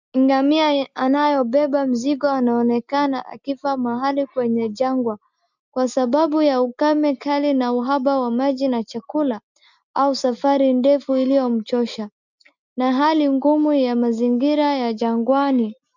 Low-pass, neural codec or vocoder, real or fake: 7.2 kHz; none; real